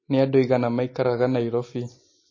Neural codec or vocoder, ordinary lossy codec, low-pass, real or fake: none; MP3, 32 kbps; 7.2 kHz; real